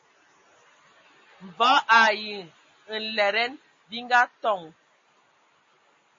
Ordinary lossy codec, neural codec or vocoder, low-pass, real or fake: MP3, 32 kbps; none; 7.2 kHz; real